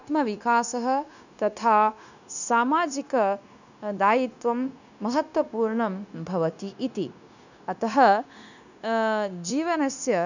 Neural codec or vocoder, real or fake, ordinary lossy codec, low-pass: codec, 16 kHz, 0.9 kbps, LongCat-Audio-Codec; fake; none; 7.2 kHz